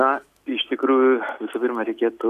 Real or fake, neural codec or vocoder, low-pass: real; none; 14.4 kHz